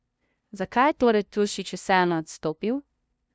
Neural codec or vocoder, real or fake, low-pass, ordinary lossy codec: codec, 16 kHz, 0.5 kbps, FunCodec, trained on LibriTTS, 25 frames a second; fake; none; none